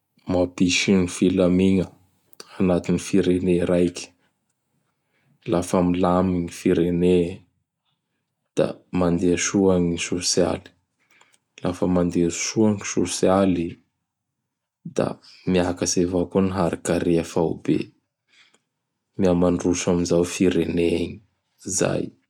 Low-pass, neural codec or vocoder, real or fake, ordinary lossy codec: 19.8 kHz; none; real; none